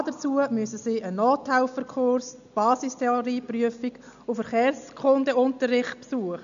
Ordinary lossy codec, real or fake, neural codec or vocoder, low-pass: AAC, 96 kbps; real; none; 7.2 kHz